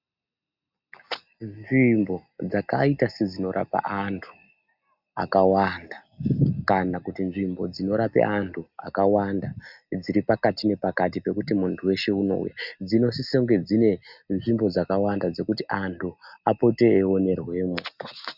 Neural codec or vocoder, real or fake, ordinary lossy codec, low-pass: none; real; Opus, 64 kbps; 5.4 kHz